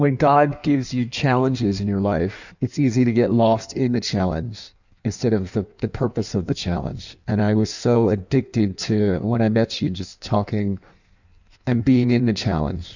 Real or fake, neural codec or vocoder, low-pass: fake; codec, 16 kHz in and 24 kHz out, 1.1 kbps, FireRedTTS-2 codec; 7.2 kHz